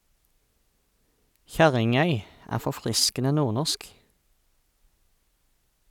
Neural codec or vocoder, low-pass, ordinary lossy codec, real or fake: none; 19.8 kHz; none; real